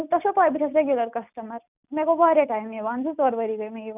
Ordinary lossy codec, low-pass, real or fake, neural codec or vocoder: none; 3.6 kHz; real; none